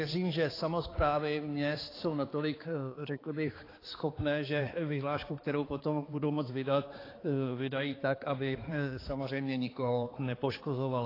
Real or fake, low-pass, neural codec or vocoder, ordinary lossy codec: fake; 5.4 kHz; codec, 16 kHz, 4 kbps, X-Codec, HuBERT features, trained on balanced general audio; AAC, 24 kbps